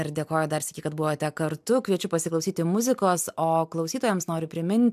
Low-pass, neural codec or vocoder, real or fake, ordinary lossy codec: 14.4 kHz; none; real; MP3, 96 kbps